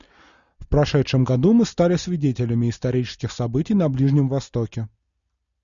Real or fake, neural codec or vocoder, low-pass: real; none; 7.2 kHz